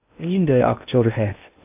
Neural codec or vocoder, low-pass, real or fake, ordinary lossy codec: codec, 16 kHz in and 24 kHz out, 0.6 kbps, FocalCodec, streaming, 2048 codes; 3.6 kHz; fake; AAC, 24 kbps